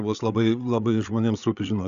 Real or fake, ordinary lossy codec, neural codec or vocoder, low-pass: fake; AAC, 96 kbps; codec, 16 kHz, 8 kbps, FreqCodec, larger model; 7.2 kHz